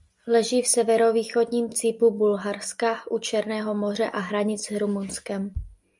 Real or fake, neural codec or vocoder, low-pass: real; none; 10.8 kHz